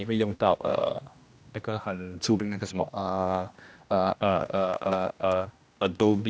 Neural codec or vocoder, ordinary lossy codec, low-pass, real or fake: codec, 16 kHz, 1 kbps, X-Codec, HuBERT features, trained on general audio; none; none; fake